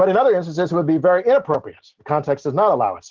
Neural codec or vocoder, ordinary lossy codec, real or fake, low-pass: none; Opus, 16 kbps; real; 7.2 kHz